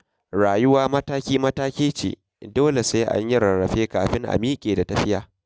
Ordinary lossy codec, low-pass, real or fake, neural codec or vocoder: none; none; real; none